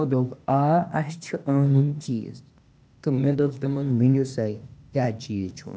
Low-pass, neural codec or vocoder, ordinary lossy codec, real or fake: none; codec, 16 kHz, 0.8 kbps, ZipCodec; none; fake